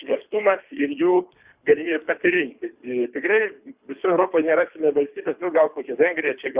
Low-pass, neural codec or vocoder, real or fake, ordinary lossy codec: 3.6 kHz; codec, 24 kHz, 3 kbps, HILCodec; fake; Opus, 64 kbps